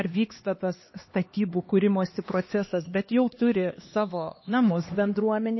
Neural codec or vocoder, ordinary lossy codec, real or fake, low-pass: codec, 16 kHz, 2 kbps, X-Codec, HuBERT features, trained on LibriSpeech; MP3, 24 kbps; fake; 7.2 kHz